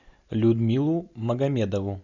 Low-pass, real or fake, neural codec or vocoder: 7.2 kHz; real; none